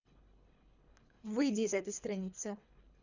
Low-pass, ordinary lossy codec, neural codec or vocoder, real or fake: 7.2 kHz; none; codec, 24 kHz, 3 kbps, HILCodec; fake